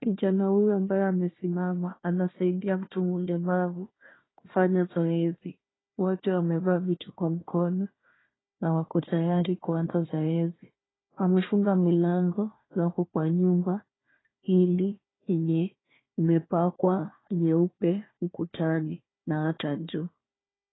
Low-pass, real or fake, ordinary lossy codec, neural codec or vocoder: 7.2 kHz; fake; AAC, 16 kbps; codec, 16 kHz, 1 kbps, FunCodec, trained on Chinese and English, 50 frames a second